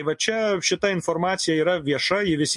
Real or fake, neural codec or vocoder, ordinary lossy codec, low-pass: real; none; MP3, 48 kbps; 10.8 kHz